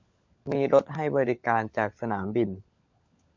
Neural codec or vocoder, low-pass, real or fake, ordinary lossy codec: codec, 16 kHz, 16 kbps, FunCodec, trained on LibriTTS, 50 frames a second; 7.2 kHz; fake; MP3, 64 kbps